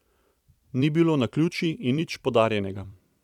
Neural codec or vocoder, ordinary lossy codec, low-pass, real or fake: vocoder, 44.1 kHz, 128 mel bands every 256 samples, BigVGAN v2; none; 19.8 kHz; fake